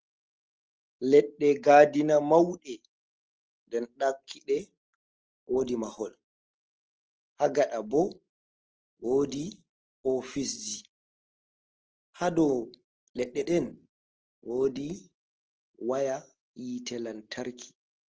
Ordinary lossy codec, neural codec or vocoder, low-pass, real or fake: Opus, 16 kbps; none; 7.2 kHz; real